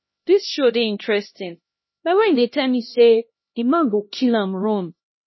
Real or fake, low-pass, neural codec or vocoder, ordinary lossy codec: fake; 7.2 kHz; codec, 16 kHz, 1 kbps, X-Codec, HuBERT features, trained on LibriSpeech; MP3, 24 kbps